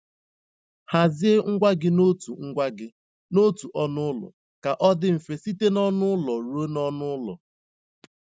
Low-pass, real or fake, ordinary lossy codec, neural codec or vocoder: none; real; none; none